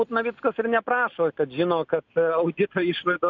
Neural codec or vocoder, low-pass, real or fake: none; 7.2 kHz; real